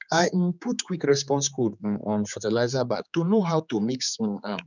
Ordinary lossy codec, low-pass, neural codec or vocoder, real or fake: none; 7.2 kHz; codec, 16 kHz, 4 kbps, X-Codec, HuBERT features, trained on general audio; fake